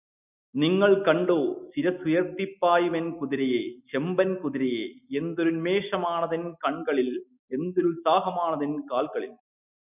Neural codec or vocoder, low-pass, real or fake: none; 3.6 kHz; real